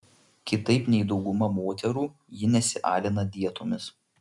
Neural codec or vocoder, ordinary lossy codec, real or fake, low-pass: none; MP3, 96 kbps; real; 10.8 kHz